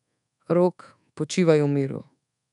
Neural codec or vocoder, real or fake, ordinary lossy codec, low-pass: codec, 24 kHz, 1.2 kbps, DualCodec; fake; none; 10.8 kHz